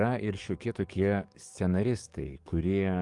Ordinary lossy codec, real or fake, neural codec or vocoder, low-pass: Opus, 32 kbps; fake; codec, 44.1 kHz, 7.8 kbps, DAC; 10.8 kHz